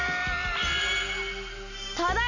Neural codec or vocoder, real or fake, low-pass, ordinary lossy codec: none; real; 7.2 kHz; none